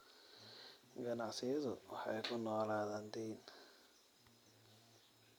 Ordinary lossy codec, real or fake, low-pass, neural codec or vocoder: none; real; none; none